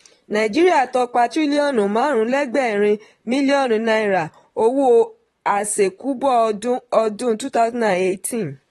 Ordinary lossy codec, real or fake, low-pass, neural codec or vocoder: AAC, 32 kbps; fake; 19.8 kHz; vocoder, 44.1 kHz, 128 mel bands, Pupu-Vocoder